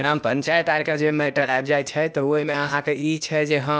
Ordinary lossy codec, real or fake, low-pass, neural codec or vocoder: none; fake; none; codec, 16 kHz, 0.8 kbps, ZipCodec